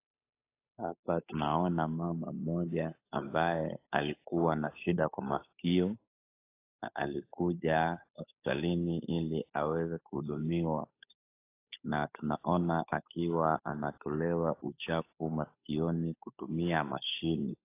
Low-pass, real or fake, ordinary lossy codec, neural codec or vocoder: 3.6 kHz; fake; AAC, 24 kbps; codec, 16 kHz, 8 kbps, FunCodec, trained on Chinese and English, 25 frames a second